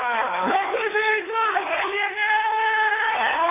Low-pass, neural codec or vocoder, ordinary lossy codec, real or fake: 3.6 kHz; codec, 16 kHz, 4 kbps, FunCodec, trained on LibriTTS, 50 frames a second; AAC, 16 kbps; fake